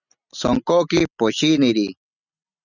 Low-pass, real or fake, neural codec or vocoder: 7.2 kHz; real; none